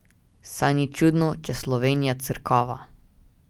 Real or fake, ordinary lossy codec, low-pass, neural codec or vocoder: real; Opus, 32 kbps; 19.8 kHz; none